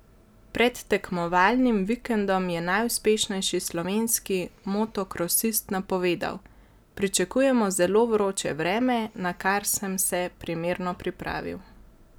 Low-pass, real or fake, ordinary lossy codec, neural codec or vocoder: none; real; none; none